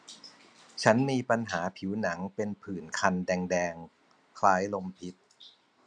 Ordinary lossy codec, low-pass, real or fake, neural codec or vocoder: none; 9.9 kHz; real; none